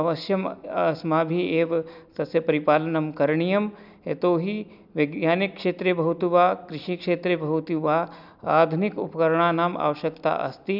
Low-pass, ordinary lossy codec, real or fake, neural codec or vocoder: 5.4 kHz; none; real; none